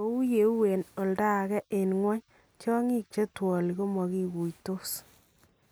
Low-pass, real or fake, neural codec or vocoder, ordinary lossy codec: none; real; none; none